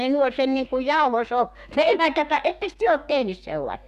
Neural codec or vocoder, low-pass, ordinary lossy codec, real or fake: codec, 32 kHz, 1.9 kbps, SNAC; 14.4 kHz; none; fake